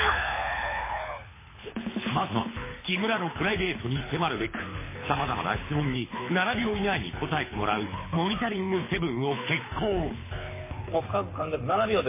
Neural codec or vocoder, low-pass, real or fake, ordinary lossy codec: codec, 24 kHz, 6 kbps, HILCodec; 3.6 kHz; fake; MP3, 16 kbps